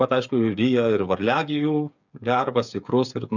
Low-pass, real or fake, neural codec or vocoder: 7.2 kHz; fake; codec, 16 kHz, 8 kbps, FreqCodec, smaller model